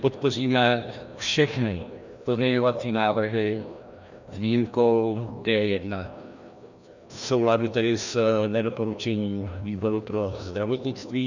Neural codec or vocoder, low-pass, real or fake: codec, 16 kHz, 1 kbps, FreqCodec, larger model; 7.2 kHz; fake